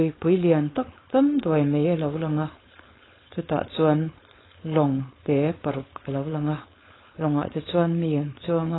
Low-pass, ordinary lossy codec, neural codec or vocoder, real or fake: 7.2 kHz; AAC, 16 kbps; codec, 16 kHz, 4.8 kbps, FACodec; fake